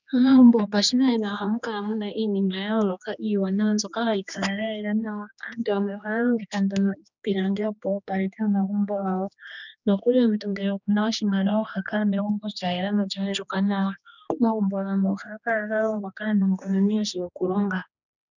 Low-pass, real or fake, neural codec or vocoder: 7.2 kHz; fake; codec, 16 kHz, 2 kbps, X-Codec, HuBERT features, trained on general audio